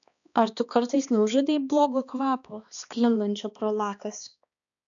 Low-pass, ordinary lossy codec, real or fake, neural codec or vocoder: 7.2 kHz; MP3, 96 kbps; fake; codec, 16 kHz, 2 kbps, X-Codec, HuBERT features, trained on balanced general audio